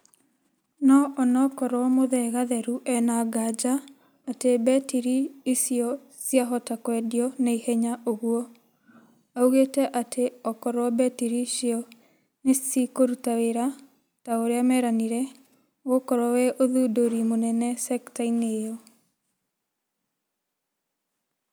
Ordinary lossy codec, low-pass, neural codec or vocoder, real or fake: none; none; none; real